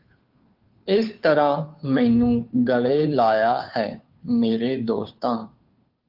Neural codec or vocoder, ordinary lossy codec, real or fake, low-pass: codec, 16 kHz, 2 kbps, FunCodec, trained on Chinese and English, 25 frames a second; Opus, 32 kbps; fake; 5.4 kHz